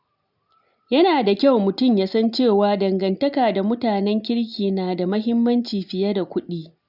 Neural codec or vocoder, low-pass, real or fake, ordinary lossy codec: none; 5.4 kHz; real; none